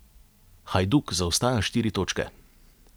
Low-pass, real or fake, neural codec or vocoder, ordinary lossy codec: none; real; none; none